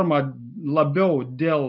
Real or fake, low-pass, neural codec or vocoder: real; 5.4 kHz; none